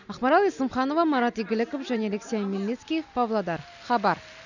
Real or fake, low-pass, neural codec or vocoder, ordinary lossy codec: real; 7.2 kHz; none; none